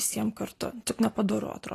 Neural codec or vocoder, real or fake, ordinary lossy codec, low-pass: none; real; AAC, 48 kbps; 14.4 kHz